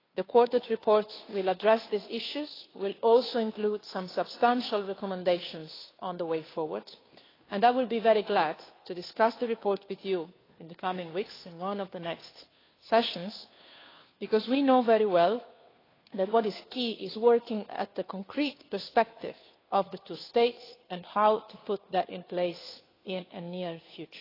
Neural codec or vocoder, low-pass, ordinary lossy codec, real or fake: codec, 16 kHz, 2 kbps, FunCodec, trained on Chinese and English, 25 frames a second; 5.4 kHz; AAC, 24 kbps; fake